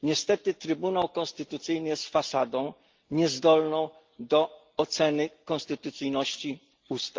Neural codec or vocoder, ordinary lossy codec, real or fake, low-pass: none; Opus, 32 kbps; real; 7.2 kHz